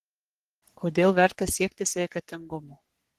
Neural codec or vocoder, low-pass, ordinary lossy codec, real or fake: codec, 44.1 kHz, 3.4 kbps, Pupu-Codec; 14.4 kHz; Opus, 16 kbps; fake